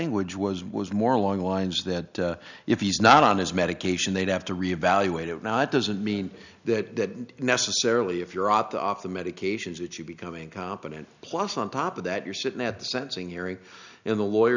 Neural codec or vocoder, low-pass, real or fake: none; 7.2 kHz; real